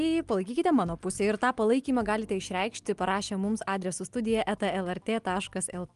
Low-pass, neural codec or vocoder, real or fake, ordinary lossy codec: 10.8 kHz; none; real; Opus, 32 kbps